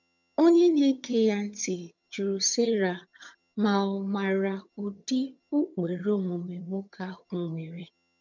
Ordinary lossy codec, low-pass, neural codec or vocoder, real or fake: none; 7.2 kHz; vocoder, 22.05 kHz, 80 mel bands, HiFi-GAN; fake